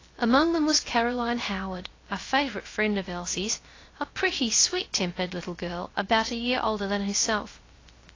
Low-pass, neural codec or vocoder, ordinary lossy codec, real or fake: 7.2 kHz; codec, 16 kHz, 0.3 kbps, FocalCodec; AAC, 32 kbps; fake